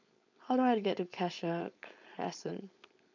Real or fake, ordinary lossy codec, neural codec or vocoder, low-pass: fake; none; codec, 16 kHz, 4.8 kbps, FACodec; 7.2 kHz